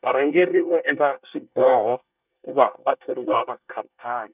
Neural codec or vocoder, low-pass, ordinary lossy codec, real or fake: codec, 24 kHz, 1 kbps, SNAC; 3.6 kHz; none; fake